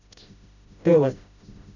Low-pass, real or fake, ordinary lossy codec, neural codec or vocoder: 7.2 kHz; fake; none; codec, 16 kHz, 0.5 kbps, FreqCodec, smaller model